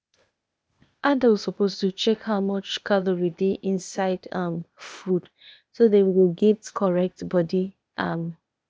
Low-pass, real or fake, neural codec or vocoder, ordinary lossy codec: none; fake; codec, 16 kHz, 0.8 kbps, ZipCodec; none